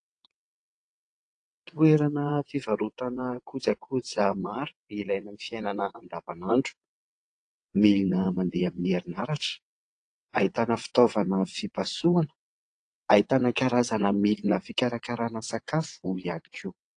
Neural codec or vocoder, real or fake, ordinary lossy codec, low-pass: vocoder, 22.05 kHz, 80 mel bands, WaveNeXt; fake; AAC, 48 kbps; 9.9 kHz